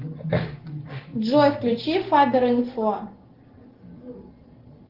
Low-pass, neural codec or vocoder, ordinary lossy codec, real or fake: 5.4 kHz; none; Opus, 16 kbps; real